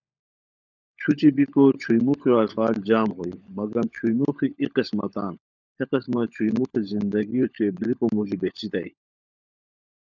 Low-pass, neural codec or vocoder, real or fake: 7.2 kHz; codec, 16 kHz, 16 kbps, FunCodec, trained on LibriTTS, 50 frames a second; fake